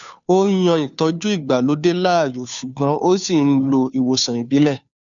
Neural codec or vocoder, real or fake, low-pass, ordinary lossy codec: codec, 16 kHz, 2 kbps, FunCodec, trained on Chinese and English, 25 frames a second; fake; 7.2 kHz; none